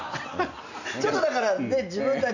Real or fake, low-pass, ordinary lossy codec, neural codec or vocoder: real; 7.2 kHz; none; none